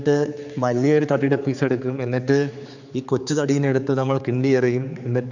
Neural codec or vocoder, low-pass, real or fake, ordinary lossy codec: codec, 16 kHz, 4 kbps, X-Codec, HuBERT features, trained on general audio; 7.2 kHz; fake; none